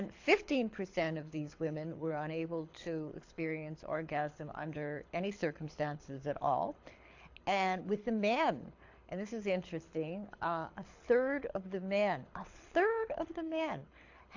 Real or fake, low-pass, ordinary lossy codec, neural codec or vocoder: fake; 7.2 kHz; AAC, 48 kbps; codec, 24 kHz, 6 kbps, HILCodec